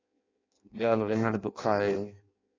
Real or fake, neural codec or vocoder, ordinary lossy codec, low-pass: fake; codec, 16 kHz in and 24 kHz out, 0.6 kbps, FireRedTTS-2 codec; AAC, 32 kbps; 7.2 kHz